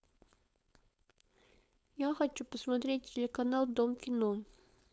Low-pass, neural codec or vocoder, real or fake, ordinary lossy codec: none; codec, 16 kHz, 4.8 kbps, FACodec; fake; none